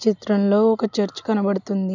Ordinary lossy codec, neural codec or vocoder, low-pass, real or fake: none; none; 7.2 kHz; real